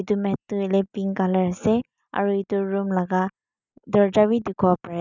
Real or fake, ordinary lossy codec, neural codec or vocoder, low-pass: real; none; none; 7.2 kHz